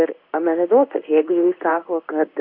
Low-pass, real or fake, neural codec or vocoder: 5.4 kHz; fake; codec, 16 kHz in and 24 kHz out, 1 kbps, XY-Tokenizer